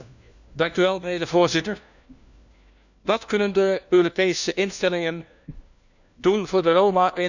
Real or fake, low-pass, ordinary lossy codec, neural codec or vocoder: fake; 7.2 kHz; none; codec, 16 kHz, 1 kbps, FunCodec, trained on LibriTTS, 50 frames a second